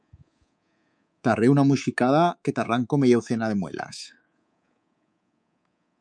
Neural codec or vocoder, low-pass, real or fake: codec, 24 kHz, 3.1 kbps, DualCodec; 9.9 kHz; fake